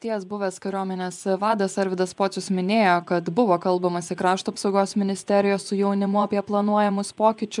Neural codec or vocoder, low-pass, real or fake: vocoder, 24 kHz, 100 mel bands, Vocos; 10.8 kHz; fake